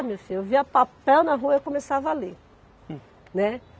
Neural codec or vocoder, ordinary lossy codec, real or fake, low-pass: none; none; real; none